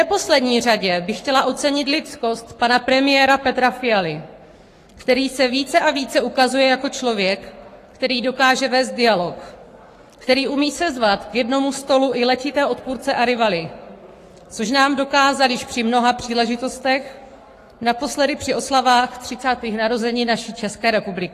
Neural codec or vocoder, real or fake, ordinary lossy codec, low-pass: codec, 44.1 kHz, 7.8 kbps, Pupu-Codec; fake; AAC, 48 kbps; 14.4 kHz